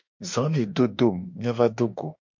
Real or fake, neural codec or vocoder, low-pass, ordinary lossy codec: fake; autoencoder, 48 kHz, 32 numbers a frame, DAC-VAE, trained on Japanese speech; 7.2 kHz; MP3, 48 kbps